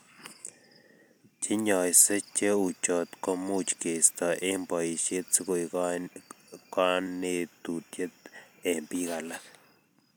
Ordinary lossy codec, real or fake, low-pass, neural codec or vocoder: none; real; none; none